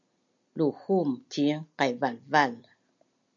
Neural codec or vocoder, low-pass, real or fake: none; 7.2 kHz; real